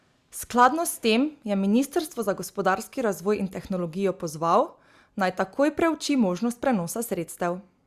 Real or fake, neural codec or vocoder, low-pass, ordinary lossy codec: real; none; 14.4 kHz; Opus, 64 kbps